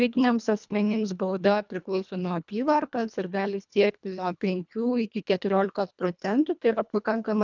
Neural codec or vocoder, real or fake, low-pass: codec, 24 kHz, 1.5 kbps, HILCodec; fake; 7.2 kHz